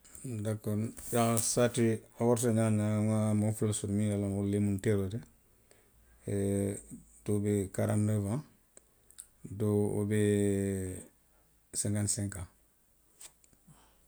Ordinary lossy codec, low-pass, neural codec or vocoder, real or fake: none; none; none; real